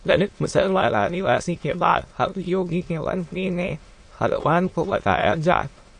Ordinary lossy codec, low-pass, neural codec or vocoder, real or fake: MP3, 48 kbps; 9.9 kHz; autoencoder, 22.05 kHz, a latent of 192 numbers a frame, VITS, trained on many speakers; fake